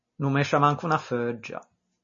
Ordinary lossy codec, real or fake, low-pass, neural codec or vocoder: MP3, 32 kbps; real; 7.2 kHz; none